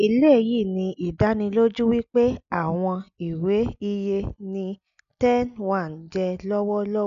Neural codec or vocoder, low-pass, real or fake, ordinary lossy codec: none; 7.2 kHz; real; none